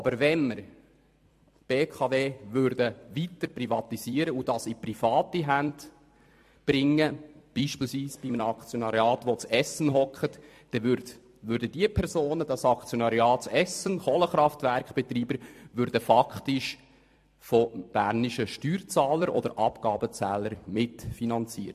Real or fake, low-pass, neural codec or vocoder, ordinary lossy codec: fake; 14.4 kHz; vocoder, 44.1 kHz, 128 mel bands every 256 samples, BigVGAN v2; MP3, 64 kbps